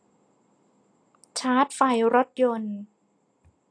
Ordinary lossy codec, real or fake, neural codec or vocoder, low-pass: AAC, 48 kbps; real; none; 9.9 kHz